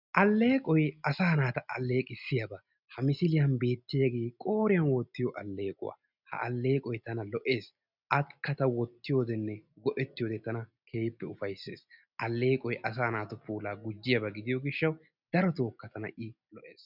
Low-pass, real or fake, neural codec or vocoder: 5.4 kHz; real; none